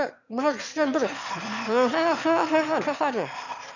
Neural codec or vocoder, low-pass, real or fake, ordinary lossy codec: autoencoder, 22.05 kHz, a latent of 192 numbers a frame, VITS, trained on one speaker; 7.2 kHz; fake; none